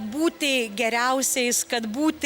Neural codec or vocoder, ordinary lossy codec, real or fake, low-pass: none; Opus, 64 kbps; real; 19.8 kHz